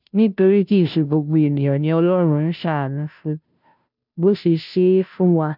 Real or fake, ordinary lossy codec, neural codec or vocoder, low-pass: fake; none; codec, 16 kHz, 0.5 kbps, FunCodec, trained on Chinese and English, 25 frames a second; 5.4 kHz